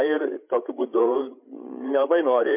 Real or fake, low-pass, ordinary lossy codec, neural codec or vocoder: fake; 3.6 kHz; MP3, 32 kbps; codec, 16 kHz, 8 kbps, FreqCodec, larger model